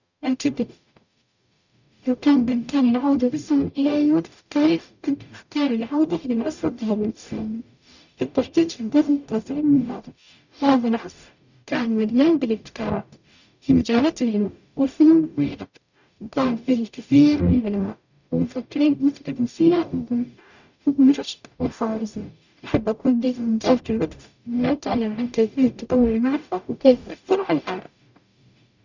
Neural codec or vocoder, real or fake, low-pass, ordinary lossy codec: codec, 44.1 kHz, 0.9 kbps, DAC; fake; 7.2 kHz; none